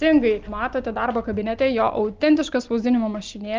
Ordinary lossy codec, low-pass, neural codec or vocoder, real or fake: Opus, 32 kbps; 7.2 kHz; none; real